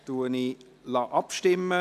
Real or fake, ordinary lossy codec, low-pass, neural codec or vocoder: real; none; 14.4 kHz; none